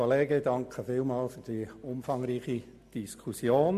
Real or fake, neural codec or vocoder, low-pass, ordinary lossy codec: real; none; 14.4 kHz; MP3, 64 kbps